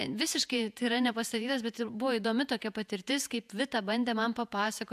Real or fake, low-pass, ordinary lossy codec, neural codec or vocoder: fake; 14.4 kHz; AAC, 96 kbps; vocoder, 48 kHz, 128 mel bands, Vocos